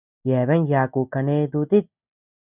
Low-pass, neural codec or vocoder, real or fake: 3.6 kHz; none; real